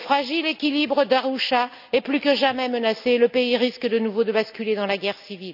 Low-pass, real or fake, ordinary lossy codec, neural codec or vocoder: 5.4 kHz; real; none; none